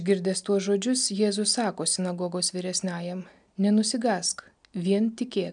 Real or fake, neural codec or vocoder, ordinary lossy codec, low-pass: real; none; MP3, 96 kbps; 9.9 kHz